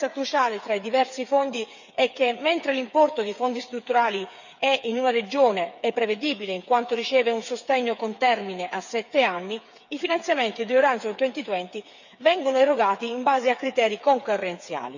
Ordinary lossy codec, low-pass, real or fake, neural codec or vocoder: none; 7.2 kHz; fake; codec, 16 kHz, 8 kbps, FreqCodec, smaller model